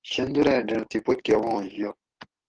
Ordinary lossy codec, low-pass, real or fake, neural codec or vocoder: Opus, 16 kbps; 9.9 kHz; fake; codec, 44.1 kHz, 7.8 kbps, DAC